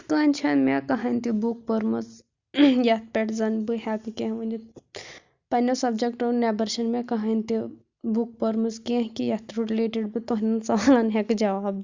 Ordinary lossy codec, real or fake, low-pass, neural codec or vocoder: Opus, 64 kbps; real; 7.2 kHz; none